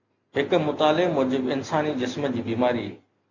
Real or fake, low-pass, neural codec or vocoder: real; 7.2 kHz; none